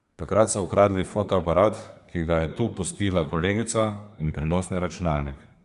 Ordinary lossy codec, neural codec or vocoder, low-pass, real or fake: Opus, 64 kbps; codec, 24 kHz, 1 kbps, SNAC; 10.8 kHz; fake